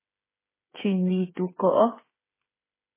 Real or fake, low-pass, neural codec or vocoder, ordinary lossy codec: fake; 3.6 kHz; codec, 16 kHz, 8 kbps, FreqCodec, smaller model; MP3, 16 kbps